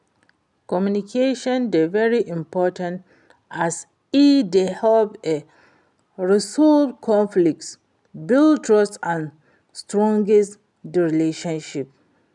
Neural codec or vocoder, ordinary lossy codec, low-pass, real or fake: none; none; 10.8 kHz; real